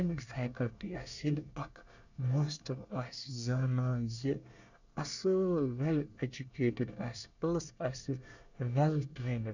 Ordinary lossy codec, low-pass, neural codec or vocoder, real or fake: none; 7.2 kHz; codec, 24 kHz, 1 kbps, SNAC; fake